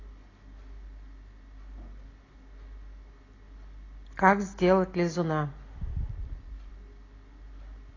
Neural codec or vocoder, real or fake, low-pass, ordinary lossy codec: none; real; 7.2 kHz; AAC, 32 kbps